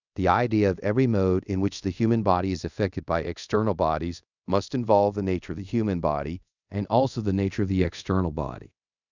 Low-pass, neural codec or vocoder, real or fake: 7.2 kHz; codec, 24 kHz, 0.5 kbps, DualCodec; fake